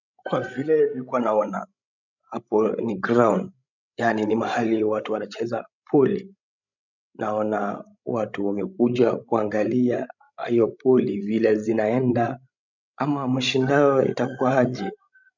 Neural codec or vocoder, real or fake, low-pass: codec, 16 kHz, 16 kbps, FreqCodec, larger model; fake; 7.2 kHz